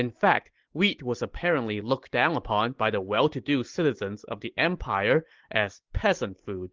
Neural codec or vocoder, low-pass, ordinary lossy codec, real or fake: none; 7.2 kHz; Opus, 16 kbps; real